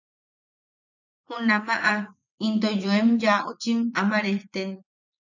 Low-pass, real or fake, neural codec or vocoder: 7.2 kHz; fake; vocoder, 44.1 kHz, 80 mel bands, Vocos